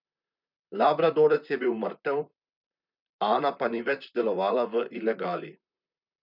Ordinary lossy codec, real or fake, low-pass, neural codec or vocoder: none; fake; 5.4 kHz; vocoder, 44.1 kHz, 128 mel bands, Pupu-Vocoder